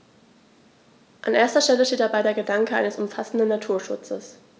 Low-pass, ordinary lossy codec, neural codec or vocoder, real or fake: none; none; none; real